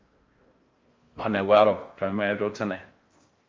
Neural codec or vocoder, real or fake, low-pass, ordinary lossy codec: codec, 16 kHz in and 24 kHz out, 0.6 kbps, FocalCodec, streaming, 4096 codes; fake; 7.2 kHz; Opus, 32 kbps